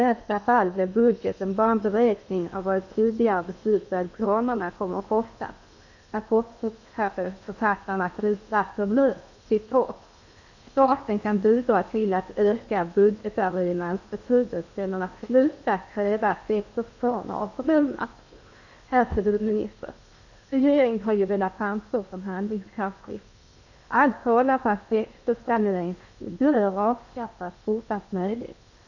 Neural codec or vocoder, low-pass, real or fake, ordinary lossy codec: codec, 16 kHz in and 24 kHz out, 0.8 kbps, FocalCodec, streaming, 65536 codes; 7.2 kHz; fake; none